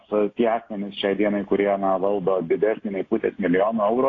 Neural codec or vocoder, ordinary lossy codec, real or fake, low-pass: none; AAC, 32 kbps; real; 7.2 kHz